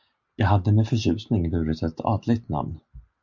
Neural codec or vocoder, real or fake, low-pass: none; real; 7.2 kHz